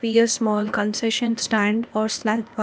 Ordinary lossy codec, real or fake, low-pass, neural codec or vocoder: none; fake; none; codec, 16 kHz, 0.8 kbps, ZipCodec